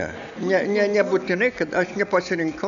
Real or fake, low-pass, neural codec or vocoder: real; 7.2 kHz; none